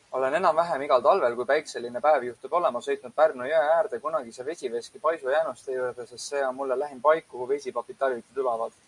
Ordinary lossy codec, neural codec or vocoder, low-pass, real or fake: MP3, 96 kbps; none; 10.8 kHz; real